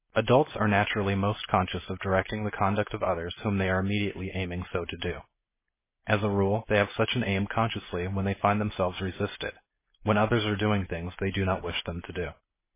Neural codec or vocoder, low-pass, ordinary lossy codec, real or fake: none; 3.6 kHz; MP3, 16 kbps; real